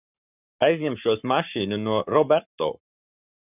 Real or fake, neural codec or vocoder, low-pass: fake; codec, 44.1 kHz, 7.8 kbps, Pupu-Codec; 3.6 kHz